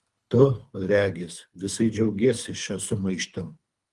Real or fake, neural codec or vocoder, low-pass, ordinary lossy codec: fake; codec, 24 kHz, 3 kbps, HILCodec; 10.8 kHz; Opus, 32 kbps